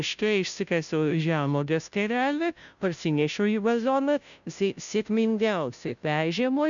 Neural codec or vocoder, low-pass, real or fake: codec, 16 kHz, 0.5 kbps, FunCodec, trained on Chinese and English, 25 frames a second; 7.2 kHz; fake